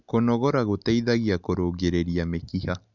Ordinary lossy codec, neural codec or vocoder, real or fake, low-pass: none; none; real; 7.2 kHz